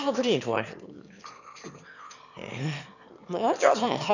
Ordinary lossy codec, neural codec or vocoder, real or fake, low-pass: none; autoencoder, 22.05 kHz, a latent of 192 numbers a frame, VITS, trained on one speaker; fake; 7.2 kHz